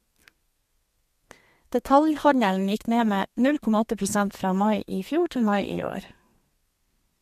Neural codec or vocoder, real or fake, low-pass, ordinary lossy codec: codec, 32 kHz, 1.9 kbps, SNAC; fake; 14.4 kHz; MP3, 64 kbps